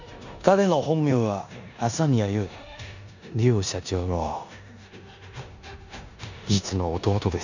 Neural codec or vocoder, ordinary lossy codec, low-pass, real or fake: codec, 16 kHz in and 24 kHz out, 0.9 kbps, LongCat-Audio-Codec, four codebook decoder; none; 7.2 kHz; fake